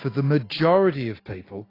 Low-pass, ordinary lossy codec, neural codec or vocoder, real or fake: 5.4 kHz; AAC, 24 kbps; none; real